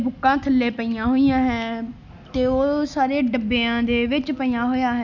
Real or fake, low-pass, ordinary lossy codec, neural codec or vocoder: real; 7.2 kHz; none; none